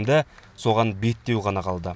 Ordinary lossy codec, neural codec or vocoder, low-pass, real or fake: none; none; none; real